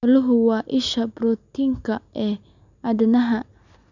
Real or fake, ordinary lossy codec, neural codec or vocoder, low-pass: real; none; none; 7.2 kHz